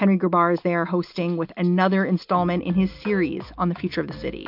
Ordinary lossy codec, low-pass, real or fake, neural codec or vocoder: MP3, 48 kbps; 5.4 kHz; real; none